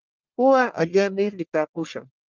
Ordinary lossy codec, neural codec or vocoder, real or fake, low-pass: Opus, 32 kbps; codec, 44.1 kHz, 1.7 kbps, Pupu-Codec; fake; 7.2 kHz